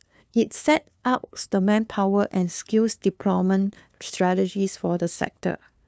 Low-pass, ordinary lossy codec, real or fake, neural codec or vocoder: none; none; fake; codec, 16 kHz, 2 kbps, FunCodec, trained on LibriTTS, 25 frames a second